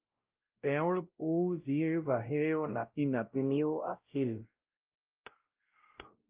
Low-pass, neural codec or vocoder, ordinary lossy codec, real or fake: 3.6 kHz; codec, 16 kHz, 0.5 kbps, X-Codec, WavLM features, trained on Multilingual LibriSpeech; Opus, 32 kbps; fake